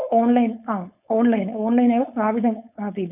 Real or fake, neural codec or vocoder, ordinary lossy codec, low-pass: fake; codec, 16 kHz, 4.8 kbps, FACodec; none; 3.6 kHz